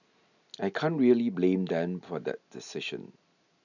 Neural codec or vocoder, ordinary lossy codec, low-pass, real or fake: none; none; 7.2 kHz; real